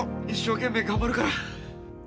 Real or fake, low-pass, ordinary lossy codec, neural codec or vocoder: real; none; none; none